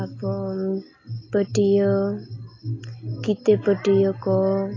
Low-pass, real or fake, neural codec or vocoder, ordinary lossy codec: 7.2 kHz; real; none; AAC, 32 kbps